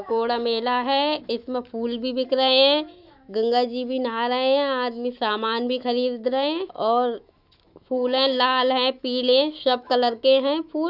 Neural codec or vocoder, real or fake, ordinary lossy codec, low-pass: autoencoder, 48 kHz, 128 numbers a frame, DAC-VAE, trained on Japanese speech; fake; none; 5.4 kHz